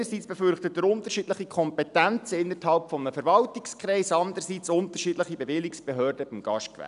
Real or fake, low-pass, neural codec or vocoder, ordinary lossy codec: real; 10.8 kHz; none; none